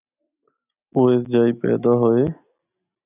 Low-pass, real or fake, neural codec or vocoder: 3.6 kHz; real; none